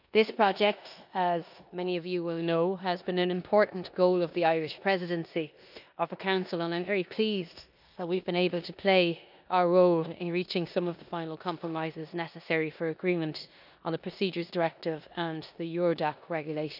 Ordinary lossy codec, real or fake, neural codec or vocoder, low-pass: none; fake; codec, 16 kHz in and 24 kHz out, 0.9 kbps, LongCat-Audio-Codec, four codebook decoder; 5.4 kHz